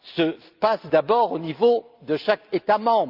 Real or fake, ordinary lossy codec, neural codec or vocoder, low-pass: real; Opus, 32 kbps; none; 5.4 kHz